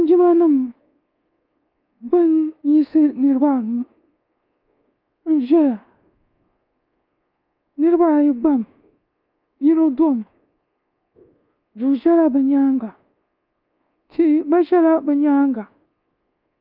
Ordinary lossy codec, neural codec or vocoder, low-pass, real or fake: Opus, 32 kbps; codec, 24 kHz, 1.2 kbps, DualCodec; 5.4 kHz; fake